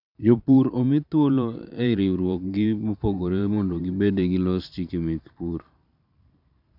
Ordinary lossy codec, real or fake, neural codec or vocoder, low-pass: none; fake; vocoder, 44.1 kHz, 128 mel bands every 512 samples, BigVGAN v2; 5.4 kHz